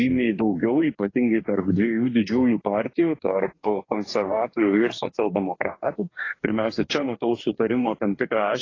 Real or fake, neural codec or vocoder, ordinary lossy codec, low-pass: fake; codec, 44.1 kHz, 2.6 kbps, DAC; AAC, 32 kbps; 7.2 kHz